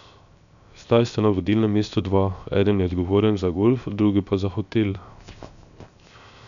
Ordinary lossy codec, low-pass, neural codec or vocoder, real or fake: none; 7.2 kHz; codec, 16 kHz, 0.7 kbps, FocalCodec; fake